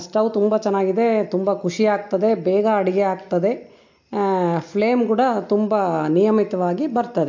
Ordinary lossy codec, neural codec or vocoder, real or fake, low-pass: MP3, 48 kbps; none; real; 7.2 kHz